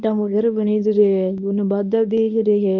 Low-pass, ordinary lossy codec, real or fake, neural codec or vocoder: 7.2 kHz; none; fake; codec, 24 kHz, 0.9 kbps, WavTokenizer, medium speech release version 1